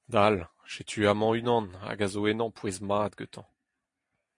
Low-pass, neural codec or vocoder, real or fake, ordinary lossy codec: 10.8 kHz; none; real; MP3, 48 kbps